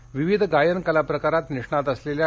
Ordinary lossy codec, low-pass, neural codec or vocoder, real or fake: none; none; none; real